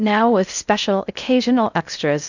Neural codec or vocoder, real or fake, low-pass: codec, 16 kHz in and 24 kHz out, 0.6 kbps, FocalCodec, streaming, 2048 codes; fake; 7.2 kHz